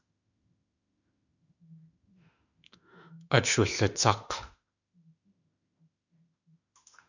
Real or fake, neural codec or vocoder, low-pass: fake; autoencoder, 48 kHz, 32 numbers a frame, DAC-VAE, trained on Japanese speech; 7.2 kHz